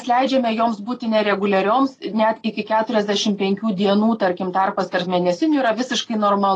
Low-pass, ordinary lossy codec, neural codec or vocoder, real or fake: 10.8 kHz; AAC, 32 kbps; none; real